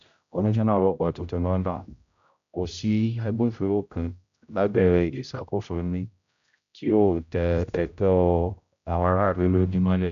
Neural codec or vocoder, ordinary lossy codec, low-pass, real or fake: codec, 16 kHz, 0.5 kbps, X-Codec, HuBERT features, trained on general audio; MP3, 96 kbps; 7.2 kHz; fake